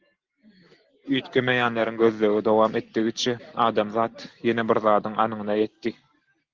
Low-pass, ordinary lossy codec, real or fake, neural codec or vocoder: 7.2 kHz; Opus, 16 kbps; real; none